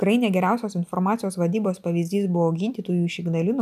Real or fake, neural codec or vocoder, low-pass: real; none; 14.4 kHz